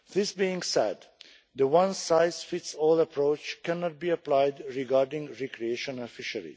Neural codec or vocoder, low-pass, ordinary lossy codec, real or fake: none; none; none; real